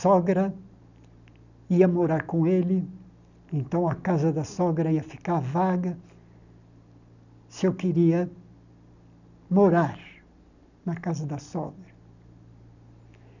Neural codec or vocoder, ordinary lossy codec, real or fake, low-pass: none; none; real; 7.2 kHz